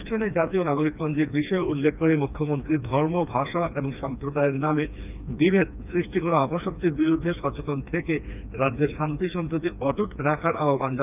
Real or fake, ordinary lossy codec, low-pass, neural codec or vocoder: fake; none; 3.6 kHz; codec, 16 kHz, 2 kbps, FreqCodec, smaller model